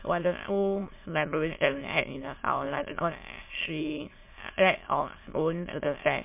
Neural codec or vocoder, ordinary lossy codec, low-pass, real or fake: autoencoder, 22.05 kHz, a latent of 192 numbers a frame, VITS, trained on many speakers; MP3, 24 kbps; 3.6 kHz; fake